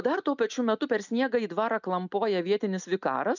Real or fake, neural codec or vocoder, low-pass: real; none; 7.2 kHz